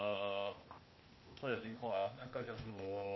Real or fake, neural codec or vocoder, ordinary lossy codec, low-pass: fake; codec, 16 kHz, 0.8 kbps, ZipCodec; MP3, 24 kbps; 7.2 kHz